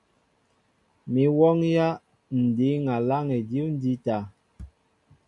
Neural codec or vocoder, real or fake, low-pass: none; real; 10.8 kHz